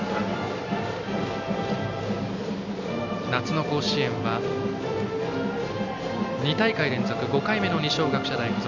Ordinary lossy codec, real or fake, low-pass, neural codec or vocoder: none; real; 7.2 kHz; none